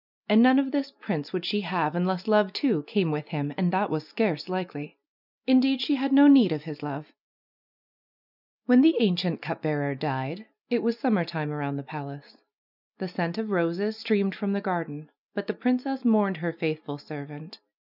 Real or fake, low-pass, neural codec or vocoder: real; 5.4 kHz; none